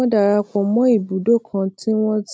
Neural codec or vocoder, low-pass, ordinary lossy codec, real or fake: none; none; none; real